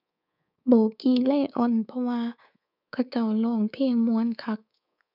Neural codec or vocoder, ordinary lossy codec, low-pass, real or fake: codec, 24 kHz, 3.1 kbps, DualCodec; none; 5.4 kHz; fake